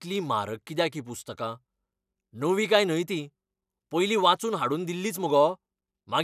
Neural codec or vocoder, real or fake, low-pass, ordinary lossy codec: none; real; 14.4 kHz; none